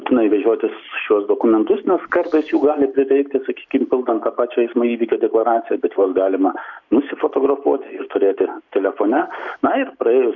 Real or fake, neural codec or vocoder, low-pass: real; none; 7.2 kHz